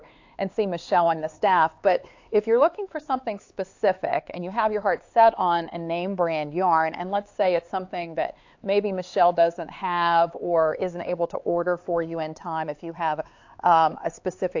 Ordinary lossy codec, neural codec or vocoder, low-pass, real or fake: AAC, 48 kbps; codec, 16 kHz, 4 kbps, X-Codec, HuBERT features, trained on LibriSpeech; 7.2 kHz; fake